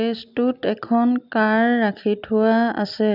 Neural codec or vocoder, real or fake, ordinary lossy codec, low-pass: none; real; none; 5.4 kHz